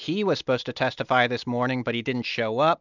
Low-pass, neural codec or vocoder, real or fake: 7.2 kHz; none; real